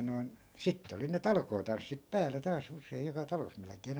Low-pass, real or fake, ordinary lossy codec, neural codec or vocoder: none; fake; none; codec, 44.1 kHz, 7.8 kbps, DAC